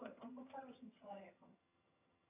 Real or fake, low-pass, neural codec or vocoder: fake; 3.6 kHz; vocoder, 22.05 kHz, 80 mel bands, HiFi-GAN